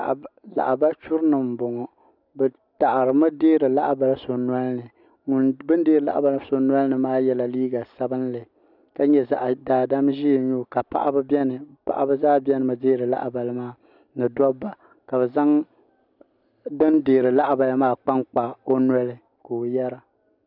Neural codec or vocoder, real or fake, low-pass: none; real; 5.4 kHz